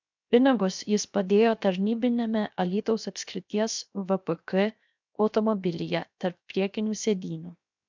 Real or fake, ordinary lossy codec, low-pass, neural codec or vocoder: fake; MP3, 64 kbps; 7.2 kHz; codec, 16 kHz, 0.7 kbps, FocalCodec